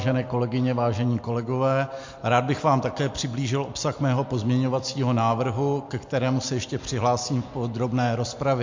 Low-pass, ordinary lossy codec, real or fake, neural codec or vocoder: 7.2 kHz; MP3, 48 kbps; real; none